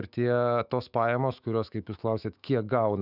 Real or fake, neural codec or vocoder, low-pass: fake; autoencoder, 48 kHz, 128 numbers a frame, DAC-VAE, trained on Japanese speech; 5.4 kHz